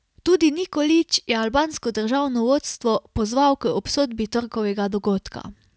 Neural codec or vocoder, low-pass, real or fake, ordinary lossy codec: none; none; real; none